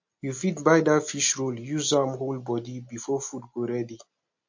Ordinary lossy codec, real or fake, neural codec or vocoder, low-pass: MP3, 48 kbps; real; none; 7.2 kHz